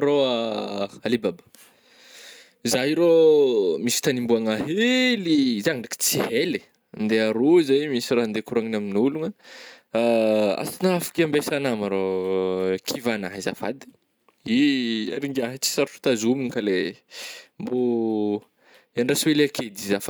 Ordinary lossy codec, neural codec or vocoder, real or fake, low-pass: none; none; real; none